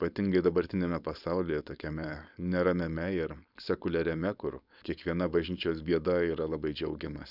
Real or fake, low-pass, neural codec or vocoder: fake; 5.4 kHz; codec, 16 kHz, 4.8 kbps, FACodec